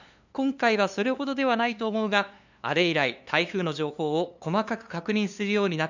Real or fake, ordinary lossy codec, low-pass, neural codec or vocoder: fake; none; 7.2 kHz; codec, 16 kHz, 2 kbps, FunCodec, trained on LibriTTS, 25 frames a second